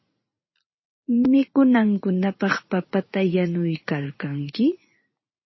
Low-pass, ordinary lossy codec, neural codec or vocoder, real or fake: 7.2 kHz; MP3, 24 kbps; vocoder, 44.1 kHz, 80 mel bands, Vocos; fake